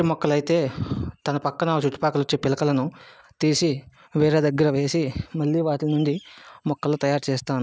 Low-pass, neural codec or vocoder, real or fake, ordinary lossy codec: none; none; real; none